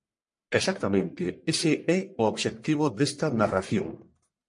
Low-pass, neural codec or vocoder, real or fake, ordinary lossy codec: 10.8 kHz; codec, 44.1 kHz, 1.7 kbps, Pupu-Codec; fake; MP3, 48 kbps